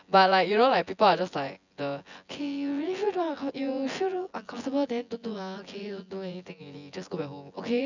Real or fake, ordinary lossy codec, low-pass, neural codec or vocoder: fake; none; 7.2 kHz; vocoder, 24 kHz, 100 mel bands, Vocos